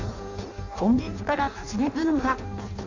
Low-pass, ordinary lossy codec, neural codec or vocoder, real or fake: 7.2 kHz; none; codec, 16 kHz in and 24 kHz out, 0.6 kbps, FireRedTTS-2 codec; fake